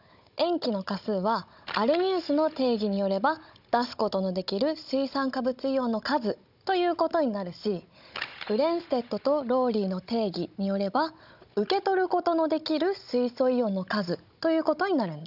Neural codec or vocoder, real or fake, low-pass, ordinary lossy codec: codec, 16 kHz, 16 kbps, FunCodec, trained on Chinese and English, 50 frames a second; fake; 5.4 kHz; none